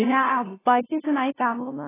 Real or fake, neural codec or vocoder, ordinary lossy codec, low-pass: fake; codec, 16 kHz, 0.5 kbps, FunCodec, trained on LibriTTS, 25 frames a second; AAC, 16 kbps; 3.6 kHz